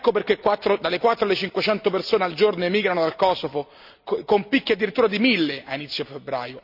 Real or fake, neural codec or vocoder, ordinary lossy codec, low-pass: real; none; none; 5.4 kHz